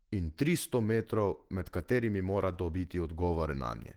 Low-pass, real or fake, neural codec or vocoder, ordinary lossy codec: 19.8 kHz; fake; autoencoder, 48 kHz, 128 numbers a frame, DAC-VAE, trained on Japanese speech; Opus, 16 kbps